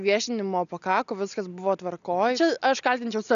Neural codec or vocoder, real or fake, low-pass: none; real; 7.2 kHz